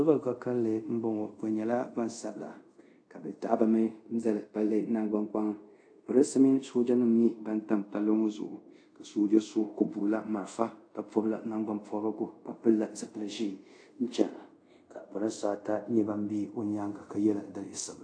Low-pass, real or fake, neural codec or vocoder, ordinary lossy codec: 9.9 kHz; fake; codec, 24 kHz, 0.5 kbps, DualCodec; AAC, 48 kbps